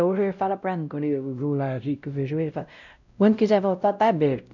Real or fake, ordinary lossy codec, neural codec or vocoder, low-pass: fake; none; codec, 16 kHz, 0.5 kbps, X-Codec, WavLM features, trained on Multilingual LibriSpeech; 7.2 kHz